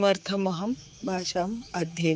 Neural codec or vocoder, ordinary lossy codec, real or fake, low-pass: codec, 16 kHz, 4 kbps, X-Codec, HuBERT features, trained on general audio; none; fake; none